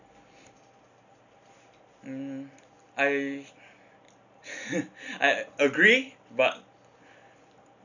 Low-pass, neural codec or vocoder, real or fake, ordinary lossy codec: 7.2 kHz; none; real; none